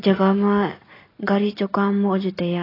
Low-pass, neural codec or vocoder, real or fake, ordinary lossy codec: 5.4 kHz; none; real; AAC, 24 kbps